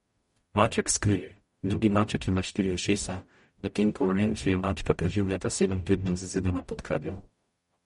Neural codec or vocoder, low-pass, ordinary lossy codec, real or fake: codec, 44.1 kHz, 0.9 kbps, DAC; 19.8 kHz; MP3, 48 kbps; fake